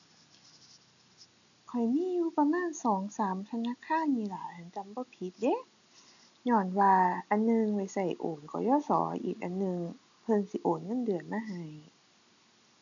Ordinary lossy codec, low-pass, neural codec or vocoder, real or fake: none; 7.2 kHz; none; real